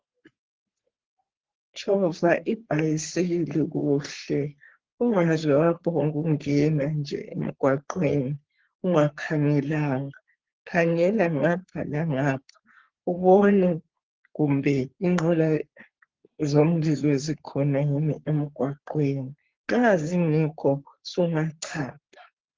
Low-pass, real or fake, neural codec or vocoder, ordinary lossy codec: 7.2 kHz; fake; codec, 44.1 kHz, 3.4 kbps, Pupu-Codec; Opus, 16 kbps